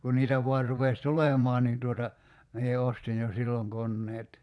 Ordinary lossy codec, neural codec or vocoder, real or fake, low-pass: none; vocoder, 22.05 kHz, 80 mel bands, Vocos; fake; none